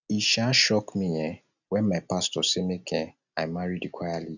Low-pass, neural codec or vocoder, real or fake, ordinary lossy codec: 7.2 kHz; none; real; none